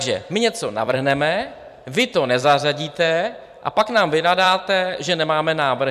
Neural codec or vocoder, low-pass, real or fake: vocoder, 44.1 kHz, 128 mel bands every 256 samples, BigVGAN v2; 14.4 kHz; fake